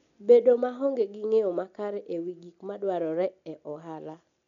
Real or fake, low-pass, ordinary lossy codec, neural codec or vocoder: real; 7.2 kHz; none; none